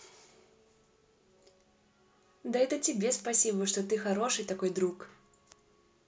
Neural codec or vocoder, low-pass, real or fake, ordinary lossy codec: none; none; real; none